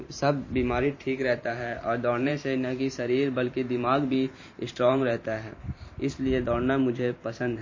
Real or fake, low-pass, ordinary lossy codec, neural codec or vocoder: fake; 7.2 kHz; MP3, 32 kbps; vocoder, 44.1 kHz, 128 mel bands every 512 samples, BigVGAN v2